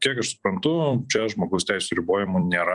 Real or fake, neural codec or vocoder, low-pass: fake; vocoder, 44.1 kHz, 128 mel bands every 256 samples, BigVGAN v2; 10.8 kHz